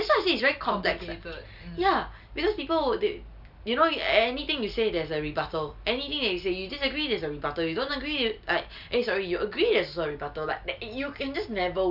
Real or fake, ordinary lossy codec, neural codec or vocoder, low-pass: real; none; none; 5.4 kHz